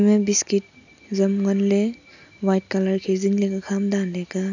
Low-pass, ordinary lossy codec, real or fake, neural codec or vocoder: 7.2 kHz; none; real; none